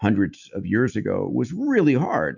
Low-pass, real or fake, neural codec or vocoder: 7.2 kHz; real; none